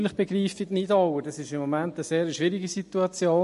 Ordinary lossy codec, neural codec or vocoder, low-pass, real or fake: MP3, 48 kbps; none; 14.4 kHz; real